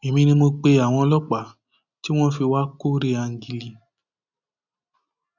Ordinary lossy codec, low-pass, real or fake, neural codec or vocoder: none; 7.2 kHz; real; none